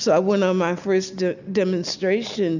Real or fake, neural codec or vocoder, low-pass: real; none; 7.2 kHz